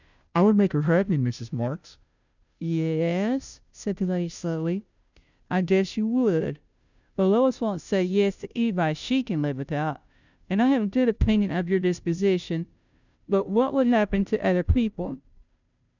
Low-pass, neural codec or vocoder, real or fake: 7.2 kHz; codec, 16 kHz, 0.5 kbps, FunCodec, trained on Chinese and English, 25 frames a second; fake